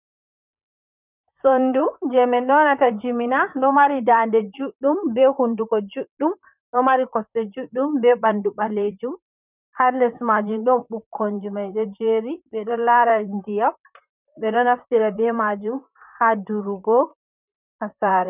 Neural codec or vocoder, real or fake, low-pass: vocoder, 44.1 kHz, 128 mel bands, Pupu-Vocoder; fake; 3.6 kHz